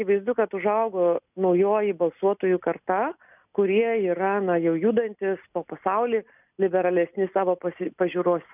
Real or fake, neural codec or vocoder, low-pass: real; none; 3.6 kHz